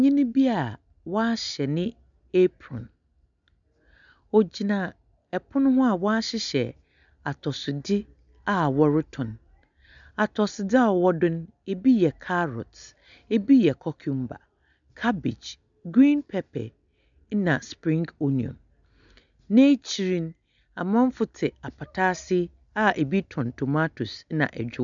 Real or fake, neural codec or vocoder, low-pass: real; none; 7.2 kHz